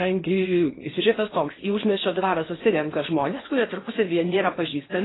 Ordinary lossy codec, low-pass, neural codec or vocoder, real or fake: AAC, 16 kbps; 7.2 kHz; codec, 16 kHz in and 24 kHz out, 0.8 kbps, FocalCodec, streaming, 65536 codes; fake